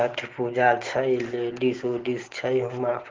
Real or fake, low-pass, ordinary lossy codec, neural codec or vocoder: real; 7.2 kHz; Opus, 16 kbps; none